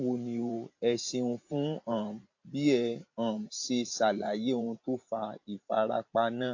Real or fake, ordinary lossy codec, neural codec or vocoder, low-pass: fake; AAC, 48 kbps; vocoder, 44.1 kHz, 128 mel bands every 512 samples, BigVGAN v2; 7.2 kHz